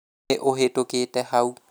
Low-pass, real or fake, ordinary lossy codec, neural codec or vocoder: none; real; none; none